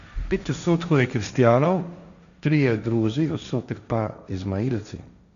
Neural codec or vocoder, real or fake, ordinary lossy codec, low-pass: codec, 16 kHz, 1.1 kbps, Voila-Tokenizer; fake; none; 7.2 kHz